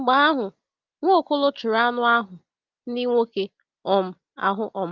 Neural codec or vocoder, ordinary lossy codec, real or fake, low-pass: none; Opus, 24 kbps; real; 7.2 kHz